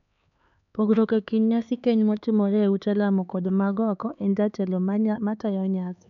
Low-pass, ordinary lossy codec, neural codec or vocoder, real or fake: 7.2 kHz; none; codec, 16 kHz, 4 kbps, X-Codec, HuBERT features, trained on LibriSpeech; fake